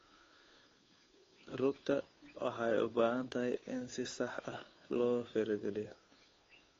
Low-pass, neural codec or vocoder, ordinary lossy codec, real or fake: 7.2 kHz; codec, 16 kHz, 2 kbps, FunCodec, trained on Chinese and English, 25 frames a second; AAC, 32 kbps; fake